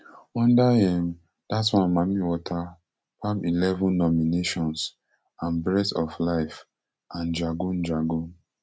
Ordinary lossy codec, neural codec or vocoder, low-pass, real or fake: none; none; none; real